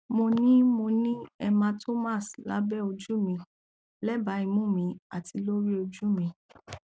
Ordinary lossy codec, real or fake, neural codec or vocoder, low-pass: none; real; none; none